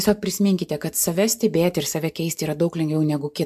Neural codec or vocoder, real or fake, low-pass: none; real; 14.4 kHz